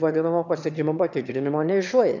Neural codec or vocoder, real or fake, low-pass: autoencoder, 22.05 kHz, a latent of 192 numbers a frame, VITS, trained on one speaker; fake; 7.2 kHz